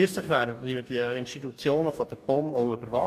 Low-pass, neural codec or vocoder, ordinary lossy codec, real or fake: 14.4 kHz; codec, 44.1 kHz, 2.6 kbps, DAC; AAC, 96 kbps; fake